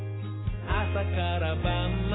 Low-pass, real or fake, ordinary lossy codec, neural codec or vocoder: 7.2 kHz; real; AAC, 16 kbps; none